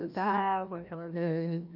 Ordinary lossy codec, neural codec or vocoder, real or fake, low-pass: none; codec, 16 kHz, 1 kbps, FreqCodec, larger model; fake; 5.4 kHz